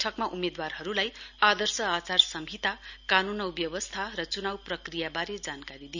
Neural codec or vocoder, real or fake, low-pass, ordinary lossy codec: none; real; 7.2 kHz; none